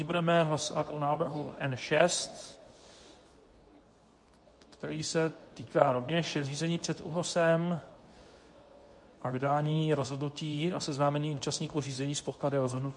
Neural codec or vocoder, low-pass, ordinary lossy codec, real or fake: codec, 24 kHz, 0.9 kbps, WavTokenizer, medium speech release version 2; 10.8 kHz; MP3, 48 kbps; fake